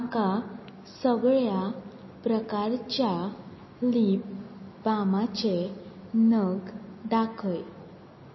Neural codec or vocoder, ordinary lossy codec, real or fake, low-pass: none; MP3, 24 kbps; real; 7.2 kHz